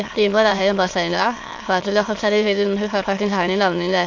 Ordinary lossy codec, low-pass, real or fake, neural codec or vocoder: AAC, 48 kbps; 7.2 kHz; fake; autoencoder, 22.05 kHz, a latent of 192 numbers a frame, VITS, trained on many speakers